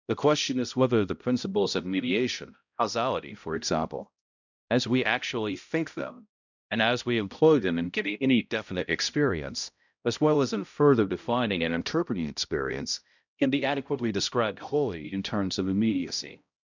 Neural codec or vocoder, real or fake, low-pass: codec, 16 kHz, 0.5 kbps, X-Codec, HuBERT features, trained on balanced general audio; fake; 7.2 kHz